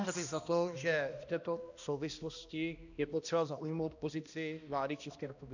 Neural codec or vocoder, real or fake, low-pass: codec, 16 kHz, 1 kbps, X-Codec, HuBERT features, trained on balanced general audio; fake; 7.2 kHz